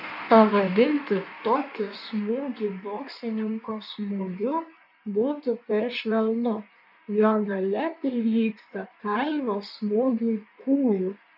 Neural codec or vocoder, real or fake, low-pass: codec, 16 kHz in and 24 kHz out, 1.1 kbps, FireRedTTS-2 codec; fake; 5.4 kHz